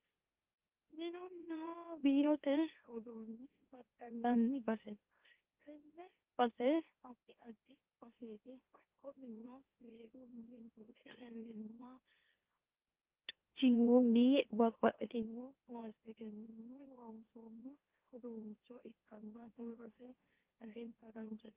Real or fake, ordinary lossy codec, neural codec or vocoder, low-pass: fake; Opus, 32 kbps; autoencoder, 44.1 kHz, a latent of 192 numbers a frame, MeloTTS; 3.6 kHz